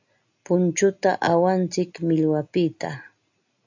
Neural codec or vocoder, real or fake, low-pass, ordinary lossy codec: none; real; 7.2 kHz; AAC, 48 kbps